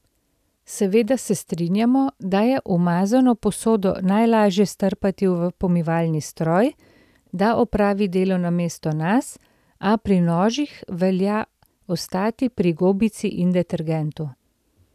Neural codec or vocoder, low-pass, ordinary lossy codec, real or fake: none; 14.4 kHz; none; real